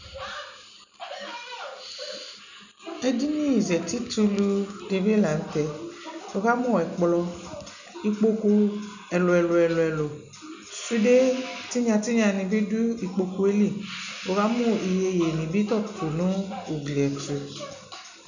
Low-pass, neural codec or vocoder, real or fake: 7.2 kHz; none; real